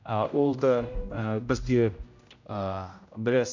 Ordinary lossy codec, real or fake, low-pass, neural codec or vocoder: MP3, 64 kbps; fake; 7.2 kHz; codec, 16 kHz, 0.5 kbps, X-Codec, HuBERT features, trained on general audio